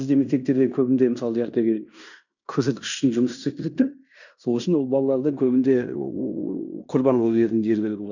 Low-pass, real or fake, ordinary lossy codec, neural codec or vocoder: 7.2 kHz; fake; none; codec, 16 kHz in and 24 kHz out, 0.9 kbps, LongCat-Audio-Codec, fine tuned four codebook decoder